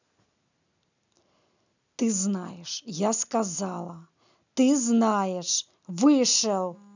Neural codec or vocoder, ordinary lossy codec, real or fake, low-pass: none; none; real; 7.2 kHz